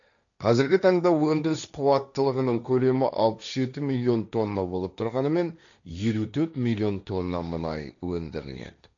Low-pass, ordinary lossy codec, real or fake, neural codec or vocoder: 7.2 kHz; none; fake; codec, 16 kHz, 1.1 kbps, Voila-Tokenizer